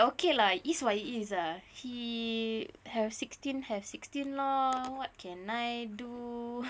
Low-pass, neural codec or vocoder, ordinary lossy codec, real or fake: none; none; none; real